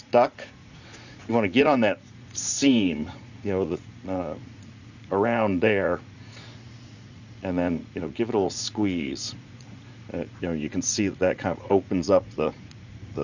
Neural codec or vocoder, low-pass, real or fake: vocoder, 44.1 kHz, 80 mel bands, Vocos; 7.2 kHz; fake